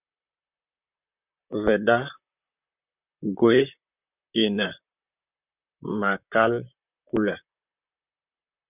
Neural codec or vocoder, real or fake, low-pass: vocoder, 44.1 kHz, 128 mel bands, Pupu-Vocoder; fake; 3.6 kHz